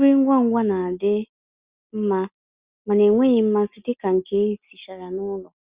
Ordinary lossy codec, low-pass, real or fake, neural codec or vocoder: Opus, 64 kbps; 3.6 kHz; real; none